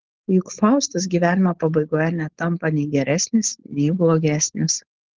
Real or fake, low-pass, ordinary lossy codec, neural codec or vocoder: fake; 7.2 kHz; Opus, 16 kbps; codec, 16 kHz, 4.8 kbps, FACodec